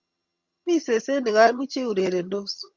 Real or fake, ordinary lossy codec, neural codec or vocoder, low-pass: fake; Opus, 64 kbps; vocoder, 22.05 kHz, 80 mel bands, HiFi-GAN; 7.2 kHz